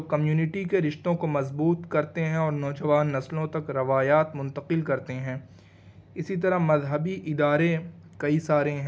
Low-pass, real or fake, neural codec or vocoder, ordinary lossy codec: none; real; none; none